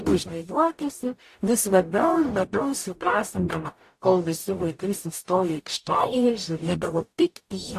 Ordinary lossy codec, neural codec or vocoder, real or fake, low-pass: AAC, 64 kbps; codec, 44.1 kHz, 0.9 kbps, DAC; fake; 14.4 kHz